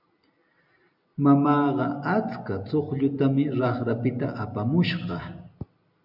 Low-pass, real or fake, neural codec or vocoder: 5.4 kHz; real; none